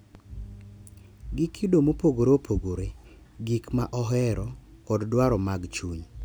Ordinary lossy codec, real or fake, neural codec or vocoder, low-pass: none; real; none; none